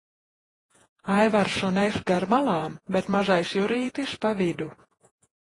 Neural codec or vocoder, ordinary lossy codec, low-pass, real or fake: vocoder, 48 kHz, 128 mel bands, Vocos; AAC, 32 kbps; 10.8 kHz; fake